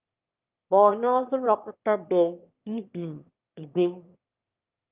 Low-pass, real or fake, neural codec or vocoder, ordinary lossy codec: 3.6 kHz; fake; autoencoder, 22.05 kHz, a latent of 192 numbers a frame, VITS, trained on one speaker; Opus, 24 kbps